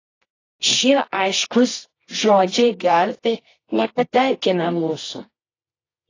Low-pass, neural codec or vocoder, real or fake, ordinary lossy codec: 7.2 kHz; codec, 24 kHz, 0.9 kbps, WavTokenizer, medium music audio release; fake; AAC, 32 kbps